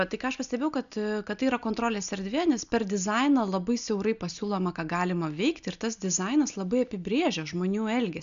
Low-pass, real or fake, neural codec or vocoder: 7.2 kHz; real; none